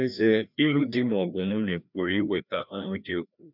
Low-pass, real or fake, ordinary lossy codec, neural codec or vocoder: 5.4 kHz; fake; none; codec, 16 kHz, 1 kbps, FreqCodec, larger model